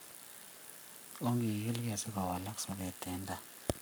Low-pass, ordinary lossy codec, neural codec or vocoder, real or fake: none; none; codec, 44.1 kHz, 7.8 kbps, Pupu-Codec; fake